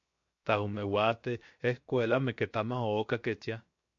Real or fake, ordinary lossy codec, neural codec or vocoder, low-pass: fake; MP3, 48 kbps; codec, 16 kHz, 0.7 kbps, FocalCodec; 7.2 kHz